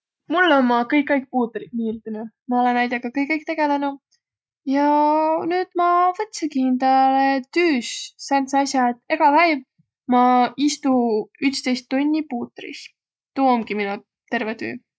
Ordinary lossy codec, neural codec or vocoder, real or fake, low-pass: none; none; real; none